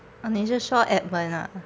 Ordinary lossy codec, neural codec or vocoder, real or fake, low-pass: none; none; real; none